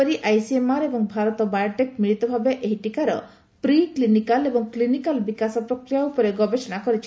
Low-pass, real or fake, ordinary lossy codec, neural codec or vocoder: none; real; none; none